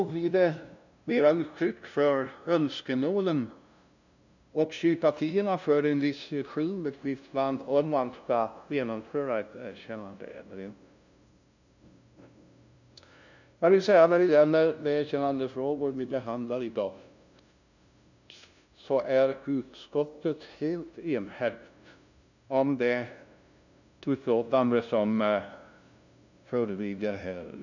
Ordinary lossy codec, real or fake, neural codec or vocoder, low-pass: none; fake; codec, 16 kHz, 0.5 kbps, FunCodec, trained on LibriTTS, 25 frames a second; 7.2 kHz